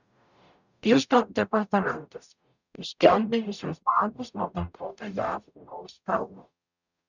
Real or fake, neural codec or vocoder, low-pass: fake; codec, 44.1 kHz, 0.9 kbps, DAC; 7.2 kHz